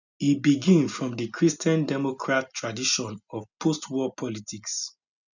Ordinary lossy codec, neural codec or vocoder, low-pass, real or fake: none; none; 7.2 kHz; real